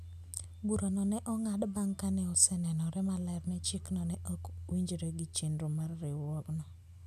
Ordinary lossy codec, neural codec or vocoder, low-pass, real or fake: none; none; 14.4 kHz; real